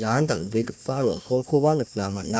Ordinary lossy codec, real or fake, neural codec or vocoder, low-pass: none; fake; codec, 16 kHz, 1 kbps, FunCodec, trained on Chinese and English, 50 frames a second; none